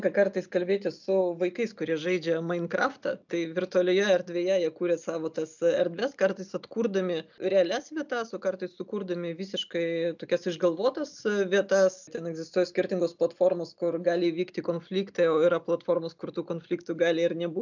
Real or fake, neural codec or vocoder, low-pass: real; none; 7.2 kHz